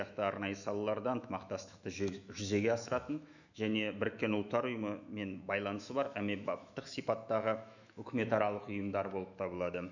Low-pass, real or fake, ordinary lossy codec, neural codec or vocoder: 7.2 kHz; fake; none; autoencoder, 48 kHz, 128 numbers a frame, DAC-VAE, trained on Japanese speech